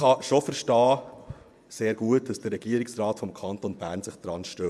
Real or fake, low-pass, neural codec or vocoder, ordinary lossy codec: fake; none; vocoder, 24 kHz, 100 mel bands, Vocos; none